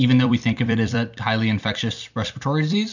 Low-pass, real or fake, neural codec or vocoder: 7.2 kHz; fake; vocoder, 44.1 kHz, 128 mel bands every 256 samples, BigVGAN v2